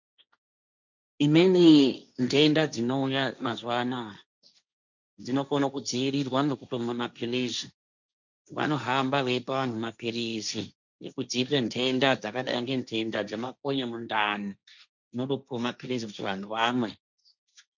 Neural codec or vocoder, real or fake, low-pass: codec, 16 kHz, 1.1 kbps, Voila-Tokenizer; fake; 7.2 kHz